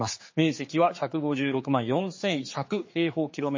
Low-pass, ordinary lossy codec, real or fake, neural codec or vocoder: 7.2 kHz; MP3, 32 kbps; fake; codec, 16 kHz, 4 kbps, X-Codec, HuBERT features, trained on general audio